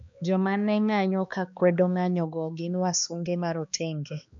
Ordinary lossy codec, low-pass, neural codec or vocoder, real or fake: MP3, 64 kbps; 7.2 kHz; codec, 16 kHz, 2 kbps, X-Codec, HuBERT features, trained on balanced general audio; fake